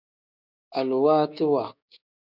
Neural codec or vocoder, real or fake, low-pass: codec, 16 kHz, 6 kbps, DAC; fake; 5.4 kHz